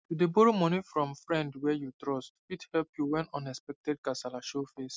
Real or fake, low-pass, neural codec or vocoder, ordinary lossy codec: real; none; none; none